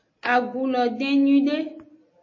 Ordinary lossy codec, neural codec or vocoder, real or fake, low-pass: MP3, 32 kbps; none; real; 7.2 kHz